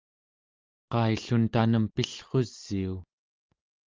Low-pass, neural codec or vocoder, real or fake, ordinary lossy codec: 7.2 kHz; none; real; Opus, 32 kbps